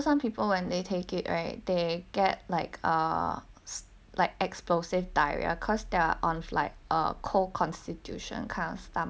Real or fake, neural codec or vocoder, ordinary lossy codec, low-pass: real; none; none; none